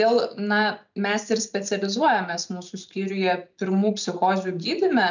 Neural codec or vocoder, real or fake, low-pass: none; real; 7.2 kHz